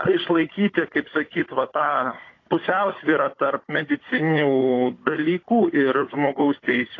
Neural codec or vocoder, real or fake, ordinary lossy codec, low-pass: codec, 16 kHz, 16 kbps, FunCodec, trained on Chinese and English, 50 frames a second; fake; AAC, 32 kbps; 7.2 kHz